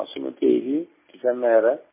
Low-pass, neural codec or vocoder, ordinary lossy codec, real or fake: 3.6 kHz; none; MP3, 16 kbps; real